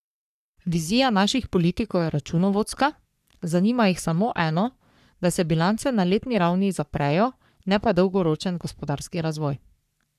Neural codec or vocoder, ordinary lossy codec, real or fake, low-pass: codec, 44.1 kHz, 3.4 kbps, Pupu-Codec; none; fake; 14.4 kHz